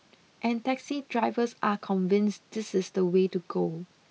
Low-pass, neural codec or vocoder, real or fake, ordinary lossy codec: none; none; real; none